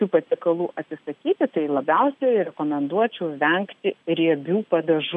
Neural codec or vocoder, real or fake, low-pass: none; real; 10.8 kHz